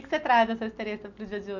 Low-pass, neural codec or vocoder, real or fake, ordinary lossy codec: 7.2 kHz; none; real; none